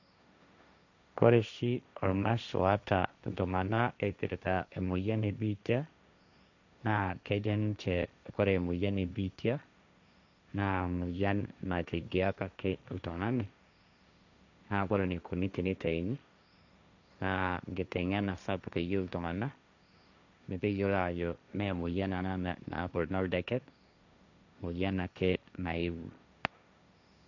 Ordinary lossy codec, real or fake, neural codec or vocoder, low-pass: none; fake; codec, 16 kHz, 1.1 kbps, Voila-Tokenizer; 7.2 kHz